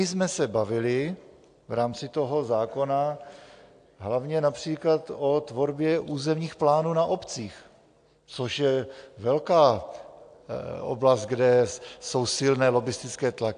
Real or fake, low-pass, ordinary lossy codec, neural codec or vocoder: real; 9.9 kHz; AAC, 64 kbps; none